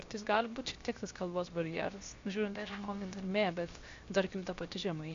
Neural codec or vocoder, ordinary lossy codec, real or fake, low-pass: codec, 16 kHz, 0.7 kbps, FocalCodec; AAC, 48 kbps; fake; 7.2 kHz